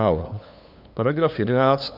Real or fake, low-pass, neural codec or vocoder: fake; 5.4 kHz; codec, 16 kHz, 2 kbps, FunCodec, trained on LibriTTS, 25 frames a second